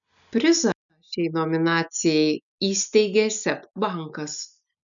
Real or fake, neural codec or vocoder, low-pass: real; none; 7.2 kHz